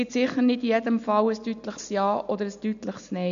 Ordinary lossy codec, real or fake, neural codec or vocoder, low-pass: none; real; none; 7.2 kHz